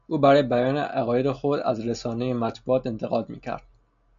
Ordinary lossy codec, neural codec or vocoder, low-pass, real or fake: MP3, 96 kbps; none; 7.2 kHz; real